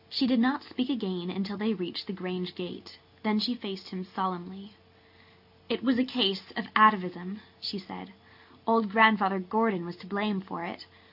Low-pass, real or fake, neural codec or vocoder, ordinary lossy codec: 5.4 kHz; real; none; AAC, 48 kbps